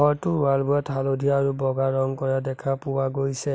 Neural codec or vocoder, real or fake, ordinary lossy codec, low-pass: none; real; none; none